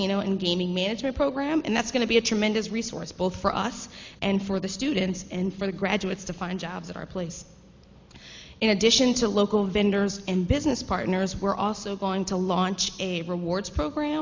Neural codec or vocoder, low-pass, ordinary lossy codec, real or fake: none; 7.2 kHz; MP3, 48 kbps; real